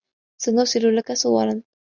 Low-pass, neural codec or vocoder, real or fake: 7.2 kHz; none; real